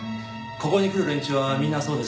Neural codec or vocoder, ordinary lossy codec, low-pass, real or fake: none; none; none; real